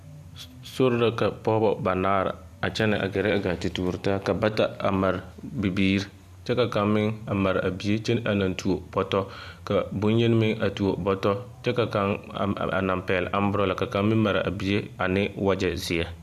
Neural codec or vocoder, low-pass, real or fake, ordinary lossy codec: none; 14.4 kHz; real; AAC, 96 kbps